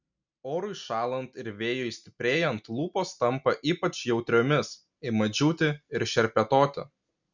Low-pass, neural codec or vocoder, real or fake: 7.2 kHz; none; real